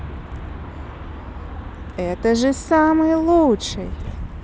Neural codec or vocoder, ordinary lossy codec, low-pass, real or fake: none; none; none; real